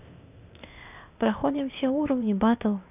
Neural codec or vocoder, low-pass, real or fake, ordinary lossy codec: codec, 16 kHz, 0.8 kbps, ZipCodec; 3.6 kHz; fake; none